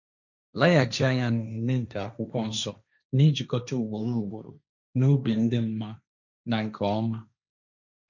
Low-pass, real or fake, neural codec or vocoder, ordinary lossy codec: 7.2 kHz; fake; codec, 16 kHz, 1.1 kbps, Voila-Tokenizer; none